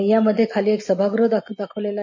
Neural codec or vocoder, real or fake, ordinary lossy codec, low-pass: none; real; MP3, 32 kbps; 7.2 kHz